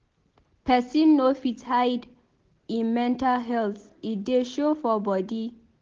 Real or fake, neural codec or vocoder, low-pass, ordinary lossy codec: real; none; 7.2 kHz; Opus, 16 kbps